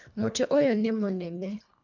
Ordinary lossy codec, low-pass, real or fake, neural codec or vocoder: none; 7.2 kHz; fake; codec, 24 kHz, 1.5 kbps, HILCodec